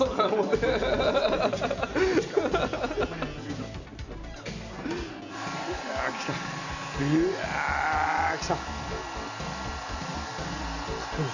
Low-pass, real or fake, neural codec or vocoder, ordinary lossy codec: 7.2 kHz; real; none; none